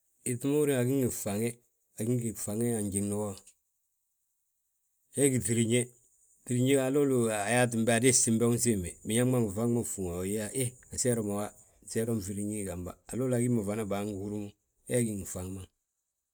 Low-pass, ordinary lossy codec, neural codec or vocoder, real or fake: none; none; none; real